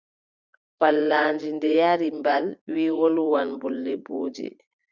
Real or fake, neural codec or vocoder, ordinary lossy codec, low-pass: fake; vocoder, 44.1 kHz, 80 mel bands, Vocos; Opus, 64 kbps; 7.2 kHz